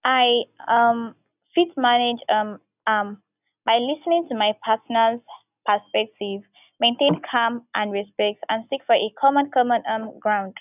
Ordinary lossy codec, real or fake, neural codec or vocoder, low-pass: none; real; none; 3.6 kHz